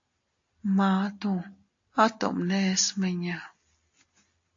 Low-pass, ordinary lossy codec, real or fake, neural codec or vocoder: 7.2 kHz; AAC, 48 kbps; real; none